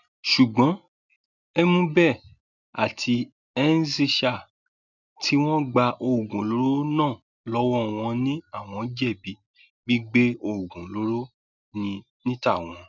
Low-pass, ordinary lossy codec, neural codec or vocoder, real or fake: 7.2 kHz; none; none; real